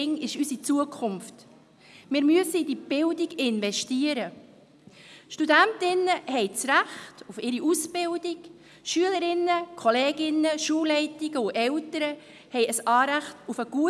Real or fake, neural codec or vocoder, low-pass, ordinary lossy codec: real; none; none; none